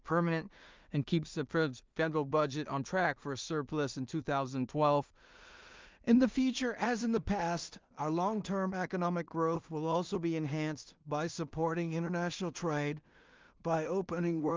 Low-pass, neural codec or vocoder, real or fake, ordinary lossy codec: 7.2 kHz; codec, 16 kHz in and 24 kHz out, 0.4 kbps, LongCat-Audio-Codec, two codebook decoder; fake; Opus, 24 kbps